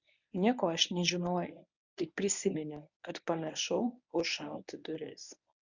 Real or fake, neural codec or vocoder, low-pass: fake; codec, 24 kHz, 0.9 kbps, WavTokenizer, medium speech release version 1; 7.2 kHz